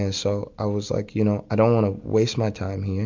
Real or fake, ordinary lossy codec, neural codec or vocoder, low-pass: real; MP3, 64 kbps; none; 7.2 kHz